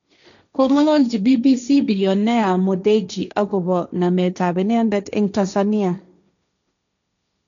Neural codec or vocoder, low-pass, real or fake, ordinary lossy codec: codec, 16 kHz, 1.1 kbps, Voila-Tokenizer; 7.2 kHz; fake; none